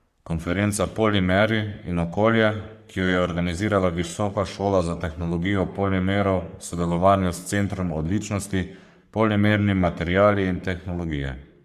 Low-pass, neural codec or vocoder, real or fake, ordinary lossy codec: 14.4 kHz; codec, 44.1 kHz, 3.4 kbps, Pupu-Codec; fake; Opus, 64 kbps